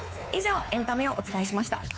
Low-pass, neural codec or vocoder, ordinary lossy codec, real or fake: none; codec, 16 kHz, 4 kbps, X-Codec, WavLM features, trained on Multilingual LibriSpeech; none; fake